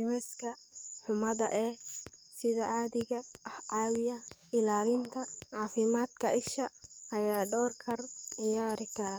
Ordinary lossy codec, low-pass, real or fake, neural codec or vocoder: none; none; fake; codec, 44.1 kHz, 7.8 kbps, DAC